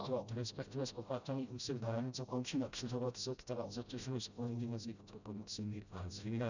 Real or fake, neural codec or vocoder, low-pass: fake; codec, 16 kHz, 0.5 kbps, FreqCodec, smaller model; 7.2 kHz